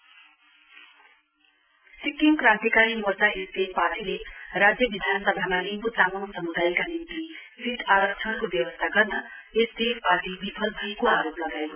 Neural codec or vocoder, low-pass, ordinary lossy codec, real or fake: none; 3.6 kHz; none; real